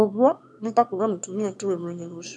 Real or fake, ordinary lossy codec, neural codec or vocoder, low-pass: fake; none; autoencoder, 22.05 kHz, a latent of 192 numbers a frame, VITS, trained on one speaker; none